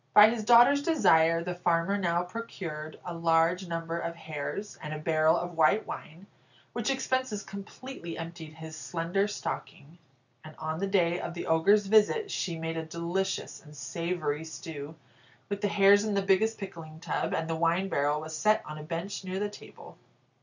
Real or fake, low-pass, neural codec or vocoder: real; 7.2 kHz; none